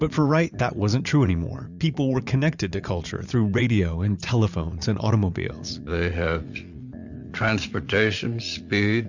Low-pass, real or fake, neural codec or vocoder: 7.2 kHz; fake; vocoder, 44.1 kHz, 80 mel bands, Vocos